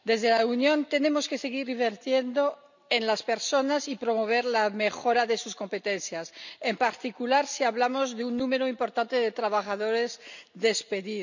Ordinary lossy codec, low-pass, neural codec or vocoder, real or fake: none; 7.2 kHz; none; real